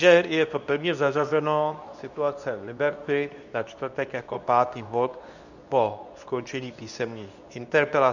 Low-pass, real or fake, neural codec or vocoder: 7.2 kHz; fake; codec, 24 kHz, 0.9 kbps, WavTokenizer, medium speech release version 2